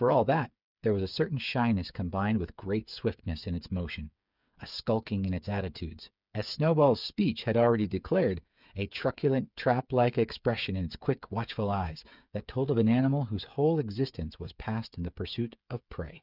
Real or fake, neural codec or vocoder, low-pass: fake; codec, 16 kHz, 8 kbps, FreqCodec, smaller model; 5.4 kHz